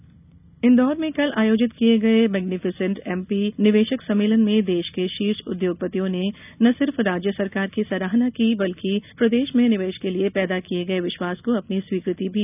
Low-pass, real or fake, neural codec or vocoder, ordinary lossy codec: 3.6 kHz; real; none; none